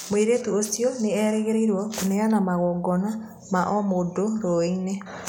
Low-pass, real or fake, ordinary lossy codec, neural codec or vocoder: none; real; none; none